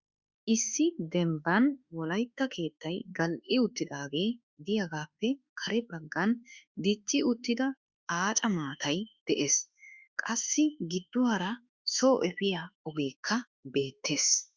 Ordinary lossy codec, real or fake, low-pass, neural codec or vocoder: Opus, 64 kbps; fake; 7.2 kHz; autoencoder, 48 kHz, 32 numbers a frame, DAC-VAE, trained on Japanese speech